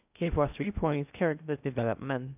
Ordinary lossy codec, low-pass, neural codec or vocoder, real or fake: none; 3.6 kHz; codec, 16 kHz in and 24 kHz out, 0.6 kbps, FocalCodec, streaming, 4096 codes; fake